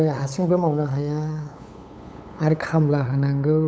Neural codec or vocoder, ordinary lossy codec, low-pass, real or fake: codec, 16 kHz, 8 kbps, FunCodec, trained on LibriTTS, 25 frames a second; none; none; fake